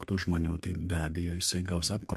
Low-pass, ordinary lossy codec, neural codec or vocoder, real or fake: 14.4 kHz; MP3, 64 kbps; codec, 32 kHz, 1.9 kbps, SNAC; fake